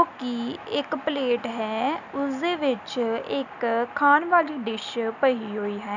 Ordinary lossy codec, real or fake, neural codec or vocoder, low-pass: none; real; none; 7.2 kHz